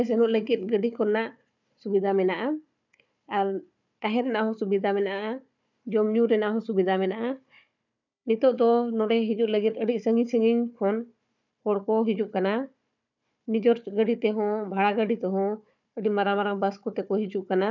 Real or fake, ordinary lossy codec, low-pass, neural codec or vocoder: fake; none; 7.2 kHz; codec, 16 kHz, 4 kbps, FunCodec, trained on Chinese and English, 50 frames a second